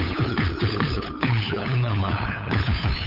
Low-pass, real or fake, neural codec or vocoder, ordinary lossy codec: 5.4 kHz; fake; codec, 16 kHz, 8 kbps, FunCodec, trained on LibriTTS, 25 frames a second; none